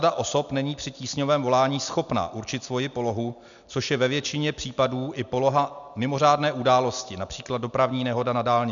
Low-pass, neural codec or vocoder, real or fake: 7.2 kHz; none; real